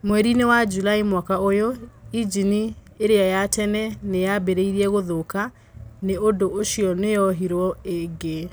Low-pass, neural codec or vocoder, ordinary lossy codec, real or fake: none; none; none; real